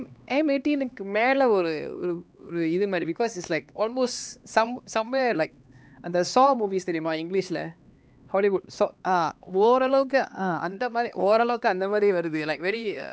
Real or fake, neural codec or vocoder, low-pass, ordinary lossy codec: fake; codec, 16 kHz, 2 kbps, X-Codec, HuBERT features, trained on LibriSpeech; none; none